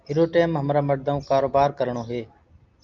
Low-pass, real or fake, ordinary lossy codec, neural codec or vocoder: 7.2 kHz; real; Opus, 24 kbps; none